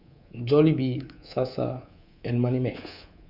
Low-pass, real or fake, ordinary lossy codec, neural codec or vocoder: 5.4 kHz; fake; Opus, 64 kbps; codec, 24 kHz, 3.1 kbps, DualCodec